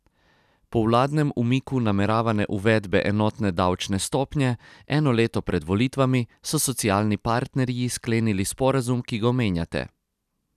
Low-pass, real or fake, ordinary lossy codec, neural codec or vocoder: 14.4 kHz; real; none; none